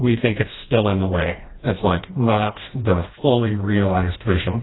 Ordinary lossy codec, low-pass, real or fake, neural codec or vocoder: AAC, 16 kbps; 7.2 kHz; fake; codec, 16 kHz, 1 kbps, FreqCodec, smaller model